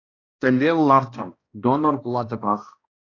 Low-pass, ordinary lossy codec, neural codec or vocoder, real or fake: 7.2 kHz; AAC, 32 kbps; codec, 16 kHz, 1 kbps, X-Codec, HuBERT features, trained on balanced general audio; fake